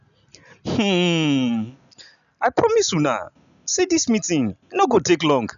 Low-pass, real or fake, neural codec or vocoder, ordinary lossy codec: 7.2 kHz; real; none; none